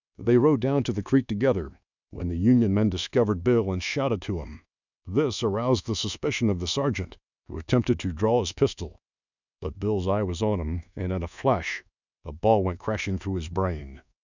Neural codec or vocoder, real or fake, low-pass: codec, 24 kHz, 1.2 kbps, DualCodec; fake; 7.2 kHz